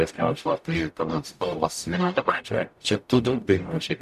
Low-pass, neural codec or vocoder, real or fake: 14.4 kHz; codec, 44.1 kHz, 0.9 kbps, DAC; fake